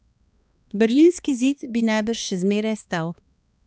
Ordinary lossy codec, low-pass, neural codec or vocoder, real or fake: none; none; codec, 16 kHz, 2 kbps, X-Codec, HuBERT features, trained on balanced general audio; fake